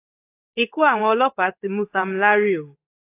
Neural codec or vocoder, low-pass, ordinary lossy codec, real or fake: codec, 16 kHz in and 24 kHz out, 1 kbps, XY-Tokenizer; 3.6 kHz; AAC, 24 kbps; fake